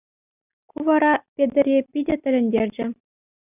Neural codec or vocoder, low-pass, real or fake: none; 3.6 kHz; real